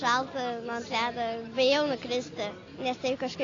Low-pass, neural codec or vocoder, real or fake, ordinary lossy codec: 7.2 kHz; none; real; AAC, 32 kbps